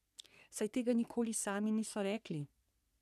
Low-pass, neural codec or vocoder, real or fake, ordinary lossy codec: 14.4 kHz; codec, 44.1 kHz, 3.4 kbps, Pupu-Codec; fake; none